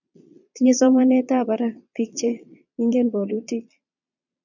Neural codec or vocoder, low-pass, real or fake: vocoder, 22.05 kHz, 80 mel bands, Vocos; 7.2 kHz; fake